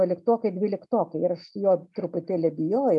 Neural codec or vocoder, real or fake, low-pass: none; real; 10.8 kHz